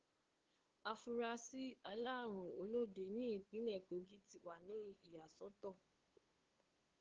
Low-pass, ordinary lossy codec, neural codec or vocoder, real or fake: 7.2 kHz; Opus, 16 kbps; codec, 16 kHz, 2 kbps, FunCodec, trained on LibriTTS, 25 frames a second; fake